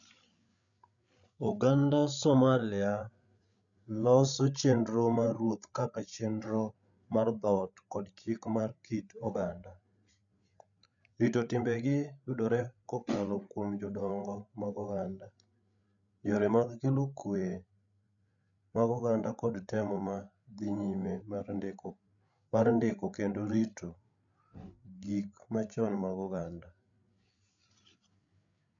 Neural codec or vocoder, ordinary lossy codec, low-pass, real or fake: codec, 16 kHz, 8 kbps, FreqCodec, larger model; none; 7.2 kHz; fake